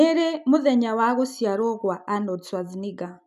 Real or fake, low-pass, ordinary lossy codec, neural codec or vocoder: real; 14.4 kHz; none; none